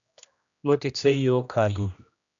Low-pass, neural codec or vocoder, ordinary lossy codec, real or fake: 7.2 kHz; codec, 16 kHz, 1 kbps, X-Codec, HuBERT features, trained on general audio; MP3, 96 kbps; fake